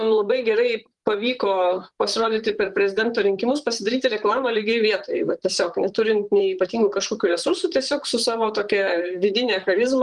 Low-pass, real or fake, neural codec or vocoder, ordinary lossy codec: 10.8 kHz; fake; vocoder, 44.1 kHz, 128 mel bands, Pupu-Vocoder; Opus, 32 kbps